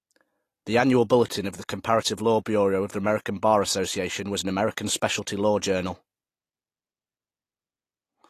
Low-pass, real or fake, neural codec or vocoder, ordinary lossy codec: 14.4 kHz; real; none; AAC, 48 kbps